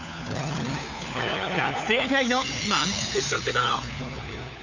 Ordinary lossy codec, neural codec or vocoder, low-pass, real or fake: none; codec, 16 kHz, 4 kbps, FunCodec, trained on LibriTTS, 50 frames a second; 7.2 kHz; fake